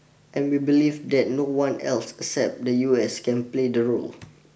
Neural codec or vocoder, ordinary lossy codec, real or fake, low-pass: none; none; real; none